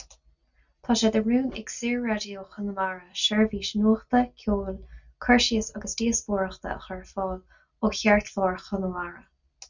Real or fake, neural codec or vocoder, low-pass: real; none; 7.2 kHz